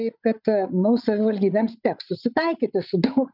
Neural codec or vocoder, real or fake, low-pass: codec, 16 kHz, 8 kbps, FreqCodec, larger model; fake; 5.4 kHz